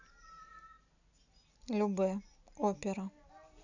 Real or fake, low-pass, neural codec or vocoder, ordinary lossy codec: real; 7.2 kHz; none; none